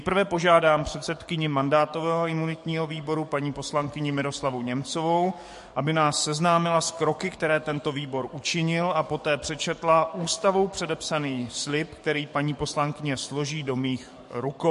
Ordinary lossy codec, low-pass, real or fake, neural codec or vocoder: MP3, 48 kbps; 14.4 kHz; fake; codec, 44.1 kHz, 7.8 kbps, DAC